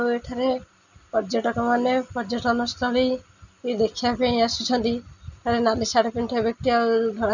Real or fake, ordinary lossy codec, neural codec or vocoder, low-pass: real; none; none; 7.2 kHz